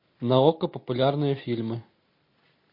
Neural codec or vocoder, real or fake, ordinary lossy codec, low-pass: none; real; MP3, 32 kbps; 5.4 kHz